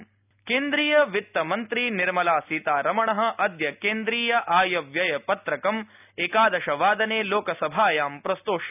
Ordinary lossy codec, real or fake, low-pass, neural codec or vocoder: none; real; 3.6 kHz; none